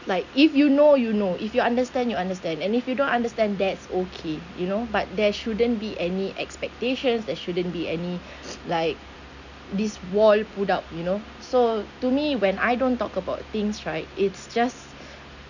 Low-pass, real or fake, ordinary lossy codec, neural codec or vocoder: 7.2 kHz; real; none; none